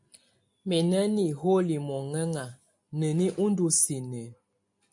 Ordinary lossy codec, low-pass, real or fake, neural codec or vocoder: MP3, 64 kbps; 10.8 kHz; real; none